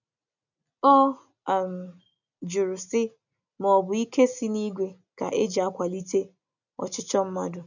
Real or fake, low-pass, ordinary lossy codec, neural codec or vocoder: real; 7.2 kHz; none; none